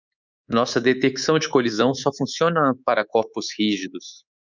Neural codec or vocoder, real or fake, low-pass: codec, 24 kHz, 3.1 kbps, DualCodec; fake; 7.2 kHz